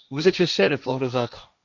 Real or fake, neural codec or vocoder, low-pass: fake; codec, 16 kHz, 1.1 kbps, Voila-Tokenizer; 7.2 kHz